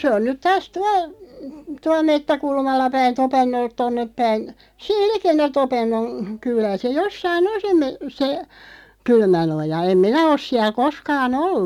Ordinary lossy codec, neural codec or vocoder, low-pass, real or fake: none; none; 19.8 kHz; real